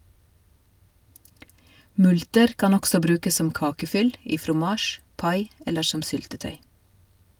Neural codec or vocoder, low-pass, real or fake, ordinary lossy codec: none; 19.8 kHz; real; Opus, 32 kbps